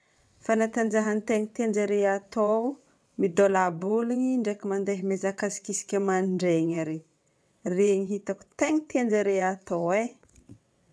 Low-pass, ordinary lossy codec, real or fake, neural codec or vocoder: none; none; fake; vocoder, 22.05 kHz, 80 mel bands, WaveNeXt